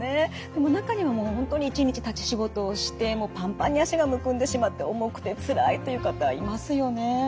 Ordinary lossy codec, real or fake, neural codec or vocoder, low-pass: none; real; none; none